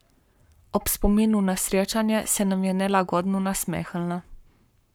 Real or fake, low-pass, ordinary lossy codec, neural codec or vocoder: fake; none; none; codec, 44.1 kHz, 7.8 kbps, Pupu-Codec